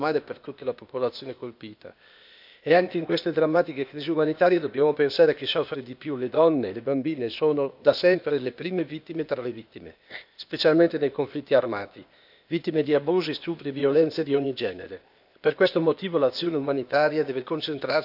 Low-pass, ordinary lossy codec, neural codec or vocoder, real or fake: 5.4 kHz; none; codec, 16 kHz, 0.8 kbps, ZipCodec; fake